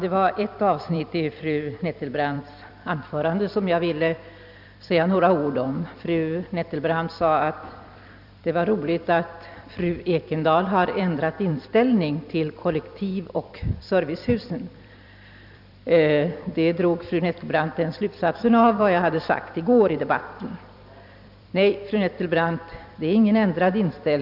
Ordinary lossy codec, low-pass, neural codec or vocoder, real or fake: none; 5.4 kHz; vocoder, 44.1 kHz, 128 mel bands every 256 samples, BigVGAN v2; fake